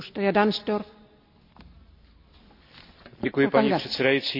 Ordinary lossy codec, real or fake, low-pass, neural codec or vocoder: AAC, 48 kbps; real; 5.4 kHz; none